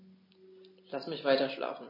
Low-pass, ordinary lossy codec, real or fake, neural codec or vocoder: 5.4 kHz; MP3, 24 kbps; real; none